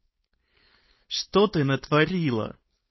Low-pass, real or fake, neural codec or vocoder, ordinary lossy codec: 7.2 kHz; fake; codec, 16 kHz, 4.8 kbps, FACodec; MP3, 24 kbps